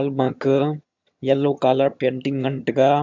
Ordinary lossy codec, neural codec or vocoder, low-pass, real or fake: MP3, 64 kbps; vocoder, 22.05 kHz, 80 mel bands, HiFi-GAN; 7.2 kHz; fake